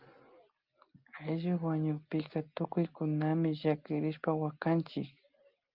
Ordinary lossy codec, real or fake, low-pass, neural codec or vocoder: Opus, 32 kbps; real; 5.4 kHz; none